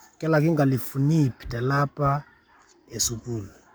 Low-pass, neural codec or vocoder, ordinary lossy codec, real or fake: none; codec, 44.1 kHz, 7.8 kbps, Pupu-Codec; none; fake